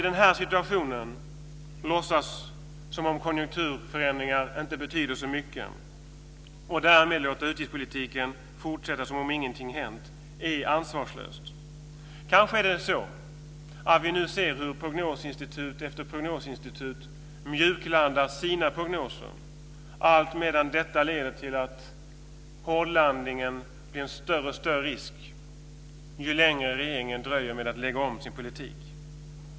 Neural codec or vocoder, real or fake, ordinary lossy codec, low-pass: none; real; none; none